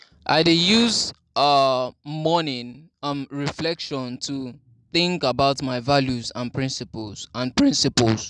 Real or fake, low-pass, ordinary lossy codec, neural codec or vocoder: real; 10.8 kHz; none; none